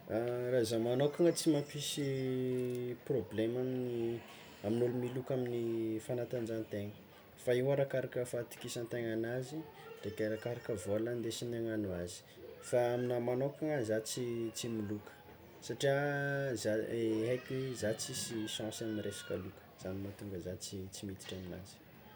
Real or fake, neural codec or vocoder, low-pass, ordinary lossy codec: real; none; none; none